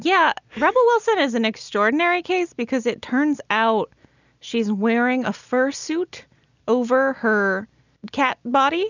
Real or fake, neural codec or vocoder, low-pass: real; none; 7.2 kHz